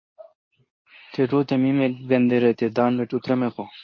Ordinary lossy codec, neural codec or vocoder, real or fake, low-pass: MP3, 32 kbps; codec, 24 kHz, 0.9 kbps, WavTokenizer, medium speech release version 2; fake; 7.2 kHz